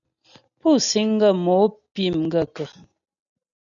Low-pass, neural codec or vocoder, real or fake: 7.2 kHz; none; real